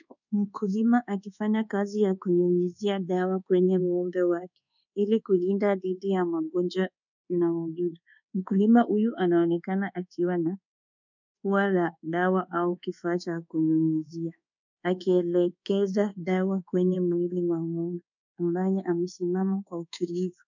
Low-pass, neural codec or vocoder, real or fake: 7.2 kHz; codec, 24 kHz, 1.2 kbps, DualCodec; fake